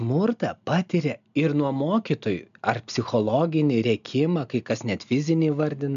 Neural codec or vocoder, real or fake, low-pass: none; real; 7.2 kHz